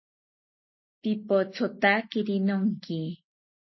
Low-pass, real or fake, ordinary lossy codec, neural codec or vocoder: 7.2 kHz; real; MP3, 24 kbps; none